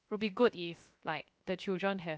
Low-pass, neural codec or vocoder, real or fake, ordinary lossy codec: none; codec, 16 kHz, 0.7 kbps, FocalCodec; fake; none